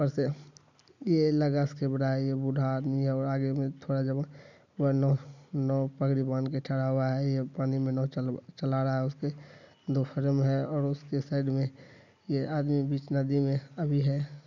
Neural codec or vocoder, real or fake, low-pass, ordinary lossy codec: none; real; 7.2 kHz; none